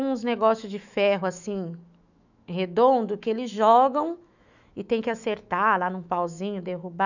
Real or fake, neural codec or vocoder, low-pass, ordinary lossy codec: fake; autoencoder, 48 kHz, 128 numbers a frame, DAC-VAE, trained on Japanese speech; 7.2 kHz; none